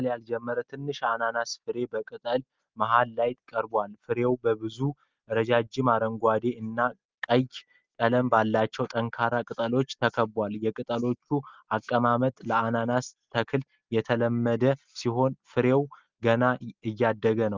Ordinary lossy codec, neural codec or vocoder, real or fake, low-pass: Opus, 32 kbps; none; real; 7.2 kHz